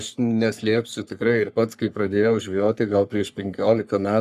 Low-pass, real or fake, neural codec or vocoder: 14.4 kHz; fake; codec, 44.1 kHz, 3.4 kbps, Pupu-Codec